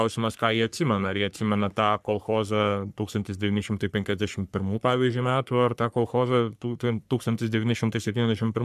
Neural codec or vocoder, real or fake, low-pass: codec, 44.1 kHz, 3.4 kbps, Pupu-Codec; fake; 14.4 kHz